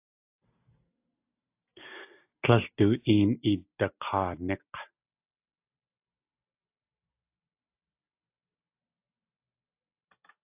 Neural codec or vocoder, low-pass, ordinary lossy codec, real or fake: none; 3.6 kHz; AAC, 32 kbps; real